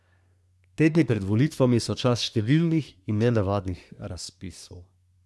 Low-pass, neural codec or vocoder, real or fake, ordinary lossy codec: none; codec, 24 kHz, 1 kbps, SNAC; fake; none